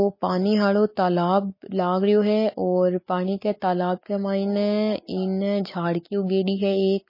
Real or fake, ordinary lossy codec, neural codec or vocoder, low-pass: real; MP3, 24 kbps; none; 5.4 kHz